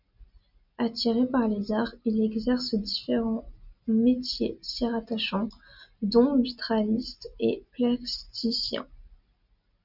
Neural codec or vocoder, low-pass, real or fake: none; 5.4 kHz; real